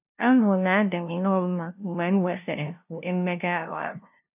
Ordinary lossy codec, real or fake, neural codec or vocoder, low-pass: none; fake; codec, 16 kHz, 0.5 kbps, FunCodec, trained on LibriTTS, 25 frames a second; 3.6 kHz